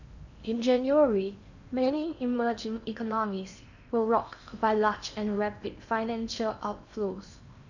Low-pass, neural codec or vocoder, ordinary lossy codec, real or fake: 7.2 kHz; codec, 16 kHz in and 24 kHz out, 0.8 kbps, FocalCodec, streaming, 65536 codes; none; fake